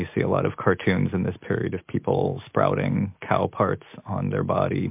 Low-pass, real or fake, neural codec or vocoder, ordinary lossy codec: 3.6 kHz; real; none; MP3, 32 kbps